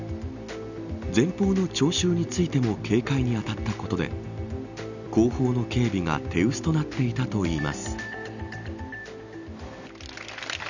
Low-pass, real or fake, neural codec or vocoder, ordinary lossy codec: 7.2 kHz; real; none; none